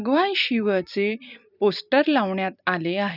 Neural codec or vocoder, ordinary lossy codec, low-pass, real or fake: vocoder, 44.1 kHz, 128 mel bands every 256 samples, BigVGAN v2; none; 5.4 kHz; fake